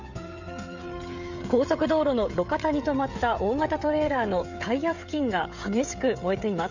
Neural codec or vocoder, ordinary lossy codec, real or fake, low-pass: codec, 16 kHz, 16 kbps, FreqCodec, smaller model; none; fake; 7.2 kHz